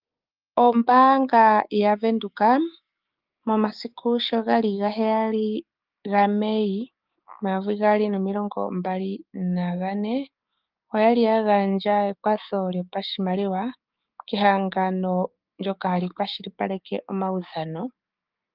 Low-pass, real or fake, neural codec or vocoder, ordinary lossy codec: 5.4 kHz; fake; codec, 16 kHz, 6 kbps, DAC; Opus, 24 kbps